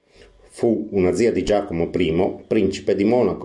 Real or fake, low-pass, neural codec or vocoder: real; 10.8 kHz; none